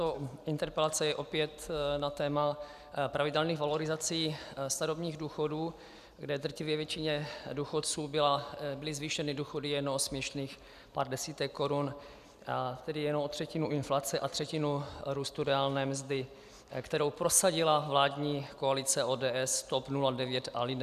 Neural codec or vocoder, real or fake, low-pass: none; real; 14.4 kHz